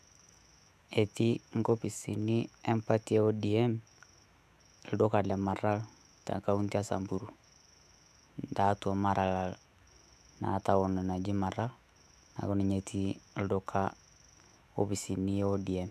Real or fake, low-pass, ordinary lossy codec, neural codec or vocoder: fake; 14.4 kHz; none; autoencoder, 48 kHz, 128 numbers a frame, DAC-VAE, trained on Japanese speech